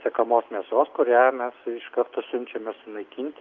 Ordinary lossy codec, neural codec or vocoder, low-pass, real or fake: Opus, 32 kbps; none; 7.2 kHz; real